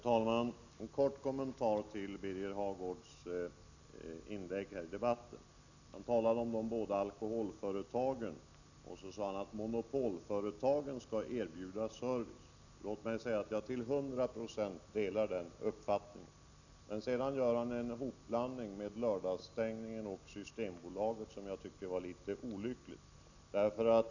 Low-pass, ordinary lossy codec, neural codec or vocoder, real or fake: 7.2 kHz; none; none; real